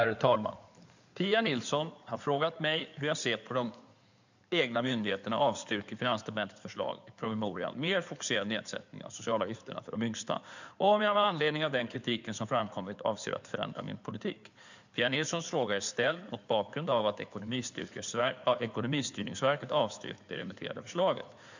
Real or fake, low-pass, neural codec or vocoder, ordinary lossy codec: fake; 7.2 kHz; codec, 16 kHz in and 24 kHz out, 2.2 kbps, FireRedTTS-2 codec; none